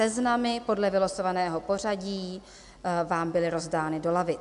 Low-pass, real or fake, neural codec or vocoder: 10.8 kHz; real; none